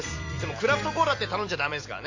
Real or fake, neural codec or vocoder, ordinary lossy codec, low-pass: fake; vocoder, 44.1 kHz, 128 mel bands every 256 samples, BigVGAN v2; AAC, 48 kbps; 7.2 kHz